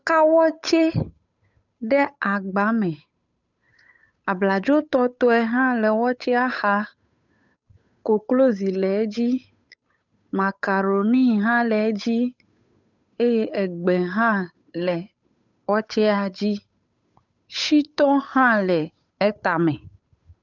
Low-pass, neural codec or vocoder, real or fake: 7.2 kHz; codec, 16 kHz, 8 kbps, FunCodec, trained on Chinese and English, 25 frames a second; fake